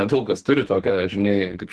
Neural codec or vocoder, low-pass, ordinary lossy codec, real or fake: codec, 44.1 kHz, 2.6 kbps, SNAC; 10.8 kHz; Opus, 16 kbps; fake